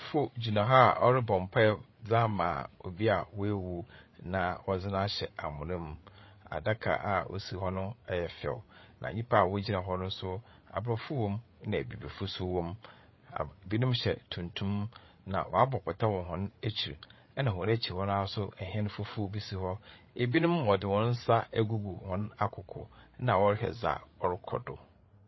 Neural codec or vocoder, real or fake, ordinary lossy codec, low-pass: codec, 16 kHz, 8 kbps, FreqCodec, larger model; fake; MP3, 24 kbps; 7.2 kHz